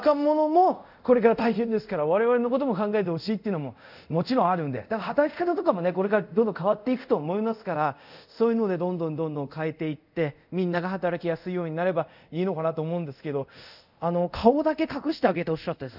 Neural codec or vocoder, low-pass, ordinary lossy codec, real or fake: codec, 24 kHz, 0.5 kbps, DualCodec; 5.4 kHz; none; fake